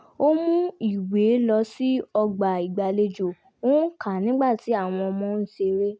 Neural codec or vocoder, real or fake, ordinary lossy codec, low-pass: none; real; none; none